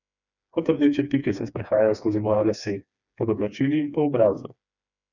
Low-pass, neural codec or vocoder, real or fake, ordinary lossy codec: 7.2 kHz; codec, 16 kHz, 2 kbps, FreqCodec, smaller model; fake; none